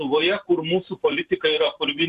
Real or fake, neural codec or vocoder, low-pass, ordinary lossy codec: fake; vocoder, 44.1 kHz, 128 mel bands every 512 samples, BigVGAN v2; 14.4 kHz; Opus, 64 kbps